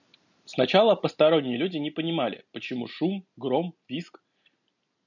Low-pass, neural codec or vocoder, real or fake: 7.2 kHz; none; real